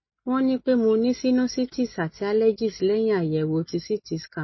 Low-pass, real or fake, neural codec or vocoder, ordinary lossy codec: 7.2 kHz; real; none; MP3, 24 kbps